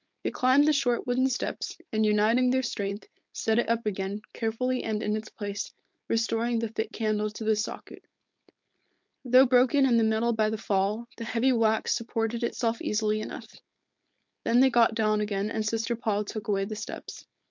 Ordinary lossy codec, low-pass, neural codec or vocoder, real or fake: MP3, 64 kbps; 7.2 kHz; codec, 16 kHz, 4.8 kbps, FACodec; fake